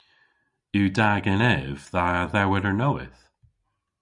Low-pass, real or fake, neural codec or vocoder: 10.8 kHz; real; none